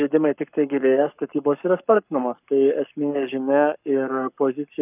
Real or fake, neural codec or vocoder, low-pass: fake; codec, 44.1 kHz, 7.8 kbps, Pupu-Codec; 3.6 kHz